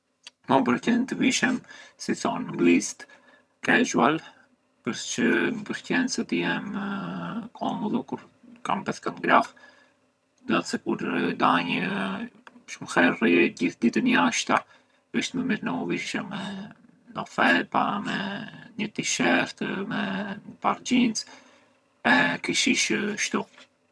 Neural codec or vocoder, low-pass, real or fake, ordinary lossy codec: vocoder, 22.05 kHz, 80 mel bands, HiFi-GAN; none; fake; none